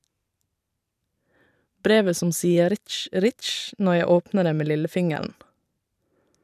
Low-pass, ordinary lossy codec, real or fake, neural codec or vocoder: 14.4 kHz; none; fake; vocoder, 44.1 kHz, 128 mel bands every 512 samples, BigVGAN v2